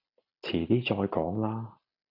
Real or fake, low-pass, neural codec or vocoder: real; 5.4 kHz; none